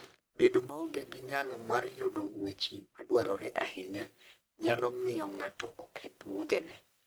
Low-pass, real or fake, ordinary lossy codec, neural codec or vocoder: none; fake; none; codec, 44.1 kHz, 1.7 kbps, Pupu-Codec